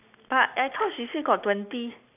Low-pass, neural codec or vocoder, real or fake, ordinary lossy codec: 3.6 kHz; none; real; none